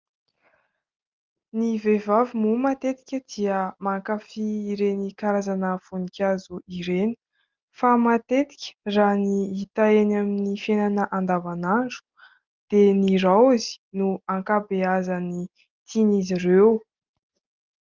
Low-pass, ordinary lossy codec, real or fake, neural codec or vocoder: 7.2 kHz; Opus, 24 kbps; real; none